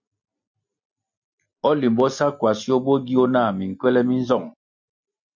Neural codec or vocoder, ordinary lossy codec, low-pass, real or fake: none; MP3, 64 kbps; 7.2 kHz; real